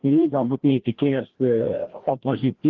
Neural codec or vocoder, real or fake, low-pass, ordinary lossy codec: codec, 16 kHz, 1 kbps, FreqCodec, larger model; fake; 7.2 kHz; Opus, 16 kbps